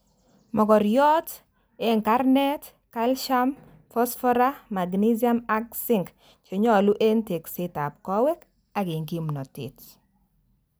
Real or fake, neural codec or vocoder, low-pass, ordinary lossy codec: real; none; none; none